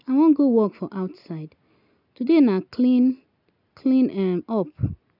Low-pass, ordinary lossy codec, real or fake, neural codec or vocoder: 5.4 kHz; none; real; none